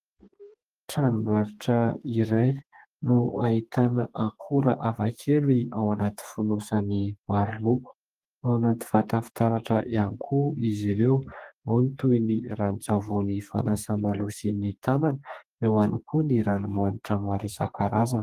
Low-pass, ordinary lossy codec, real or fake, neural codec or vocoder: 14.4 kHz; Opus, 24 kbps; fake; codec, 32 kHz, 1.9 kbps, SNAC